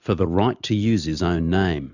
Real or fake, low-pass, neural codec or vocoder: real; 7.2 kHz; none